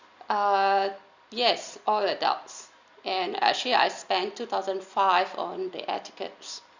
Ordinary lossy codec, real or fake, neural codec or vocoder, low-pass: Opus, 64 kbps; real; none; 7.2 kHz